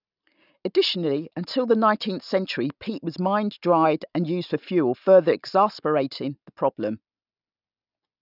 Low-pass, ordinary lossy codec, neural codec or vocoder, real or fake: 5.4 kHz; none; none; real